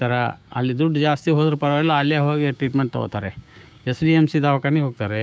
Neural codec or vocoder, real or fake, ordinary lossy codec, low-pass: codec, 16 kHz, 6 kbps, DAC; fake; none; none